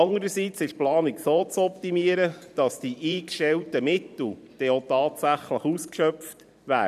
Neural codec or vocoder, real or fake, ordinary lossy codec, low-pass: vocoder, 44.1 kHz, 128 mel bands every 512 samples, BigVGAN v2; fake; none; 14.4 kHz